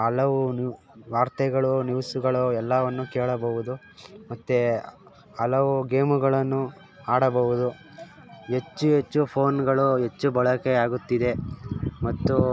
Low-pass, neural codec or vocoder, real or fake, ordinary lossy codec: none; none; real; none